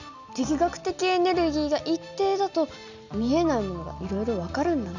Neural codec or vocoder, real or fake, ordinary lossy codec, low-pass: none; real; none; 7.2 kHz